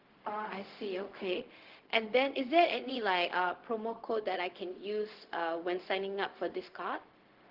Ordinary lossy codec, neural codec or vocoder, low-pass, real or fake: Opus, 16 kbps; codec, 16 kHz, 0.4 kbps, LongCat-Audio-Codec; 5.4 kHz; fake